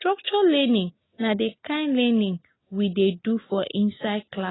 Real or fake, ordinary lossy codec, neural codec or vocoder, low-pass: real; AAC, 16 kbps; none; 7.2 kHz